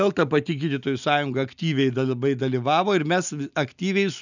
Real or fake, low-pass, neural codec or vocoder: real; 7.2 kHz; none